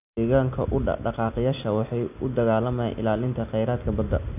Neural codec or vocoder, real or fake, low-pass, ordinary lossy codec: none; real; 3.6 kHz; none